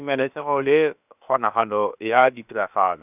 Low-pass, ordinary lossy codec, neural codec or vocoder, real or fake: 3.6 kHz; none; codec, 16 kHz, about 1 kbps, DyCAST, with the encoder's durations; fake